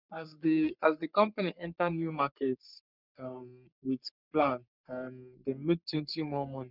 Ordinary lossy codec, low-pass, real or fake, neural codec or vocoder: none; 5.4 kHz; fake; codec, 44.1 kHz, 3.4 kbps, Pupu-Codec